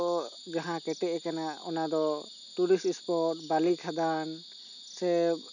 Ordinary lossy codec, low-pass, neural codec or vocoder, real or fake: none; 7.2 kHz; none; real